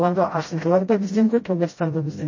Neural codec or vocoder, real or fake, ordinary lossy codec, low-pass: codec, 16 kHz, 0.5 kbps, FreqCodec, smaller model; fake; MP3, 32 kbps; 7.2 kHz